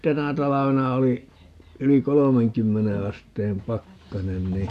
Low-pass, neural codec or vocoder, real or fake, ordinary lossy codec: 14.4 kHz; none; real; none